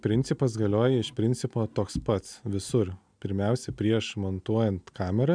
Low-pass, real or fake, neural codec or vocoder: 9.9 kHz; real; none